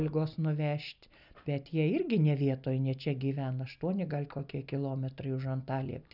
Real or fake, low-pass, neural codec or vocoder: real; 5.4 kHz; none